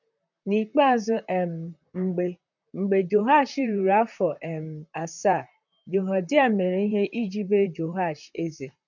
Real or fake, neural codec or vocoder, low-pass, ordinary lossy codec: fake; vocoder, 44.1 kHz, 128 mel bands, Pupu-Vocoder; 7.2 kHz; none